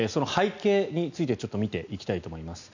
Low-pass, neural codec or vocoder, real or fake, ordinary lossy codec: 7.2 kHz; none; real; none